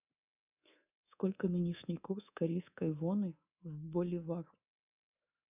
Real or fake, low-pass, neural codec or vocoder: fake; 3.6 kHz; codec, 24 kHz, 3.1 kbps, DualCodec